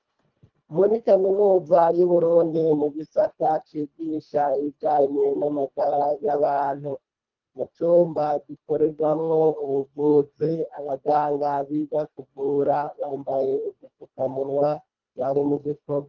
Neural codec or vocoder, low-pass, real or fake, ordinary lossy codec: codec, 24 kHz, 1.5 kbps, HILCodec; 7.2 kHz; fake; Opus, 32 kbps